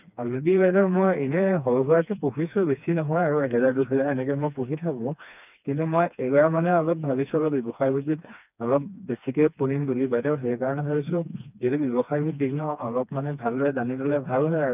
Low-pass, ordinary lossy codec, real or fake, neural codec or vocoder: 3.6 kHz; none; fake; codec, 16 kHz, 2 kbps, FreqCodec, smaller model